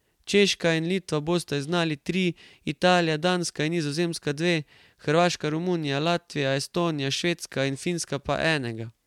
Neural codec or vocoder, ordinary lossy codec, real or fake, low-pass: none; MP3, 96 kbps; real; 19.8 kHz